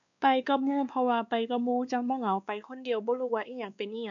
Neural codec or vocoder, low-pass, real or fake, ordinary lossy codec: codec, 16 kHz, 2 kbps, X-Codec, WavLM features, trained on Multilingual LibriSpeech; 7.2 kHz; fake; MP3, 96 kbps